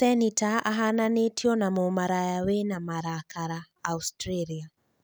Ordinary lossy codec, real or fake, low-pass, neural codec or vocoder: none; real; none; none